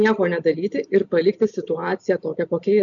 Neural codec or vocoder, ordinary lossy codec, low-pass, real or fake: none; MP3, 96 kbps; 7.2 kHz; real